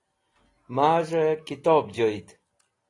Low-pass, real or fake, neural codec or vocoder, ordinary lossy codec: 10.8 kHz; real; none; AAC, 32 kbps